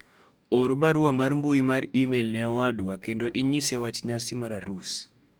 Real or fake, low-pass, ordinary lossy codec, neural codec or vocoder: fake; none; none; codec, 44.1 kHz, 2.6 kbps, DAC